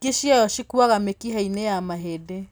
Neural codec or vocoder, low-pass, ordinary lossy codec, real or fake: none; none; none; real